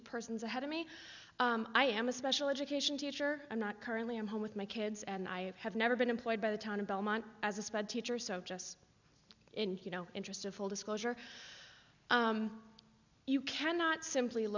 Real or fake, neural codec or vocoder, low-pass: real; none; 7.2 kHz